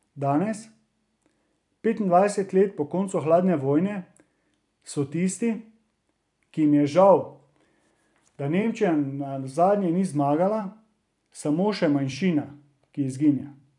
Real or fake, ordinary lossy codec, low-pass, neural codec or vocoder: fake; none; 10.8 kHz; vocoder, 44.1 kHz, 128 mel bands every 256 samples, BigVGAN v2